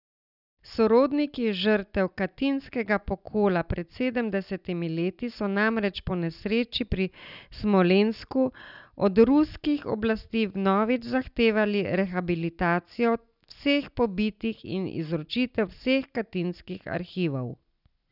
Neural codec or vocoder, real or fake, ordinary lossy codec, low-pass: none; real; none; 5.4 kHz